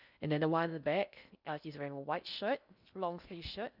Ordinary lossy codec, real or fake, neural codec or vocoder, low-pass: none; fake; codec, 16 kHz in and 24 kHz out, 0.6 kbps, FocalCodec, streaming, 4096 codes; 5.4 kHz